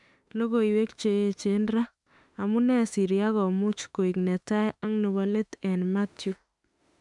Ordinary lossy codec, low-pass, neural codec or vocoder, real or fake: MP3, 96 kbps; 10.8 kHz; autoencoder, 48 kHz, 32 numbers a frame, DAC-VAE, trained on Japanese speech; fake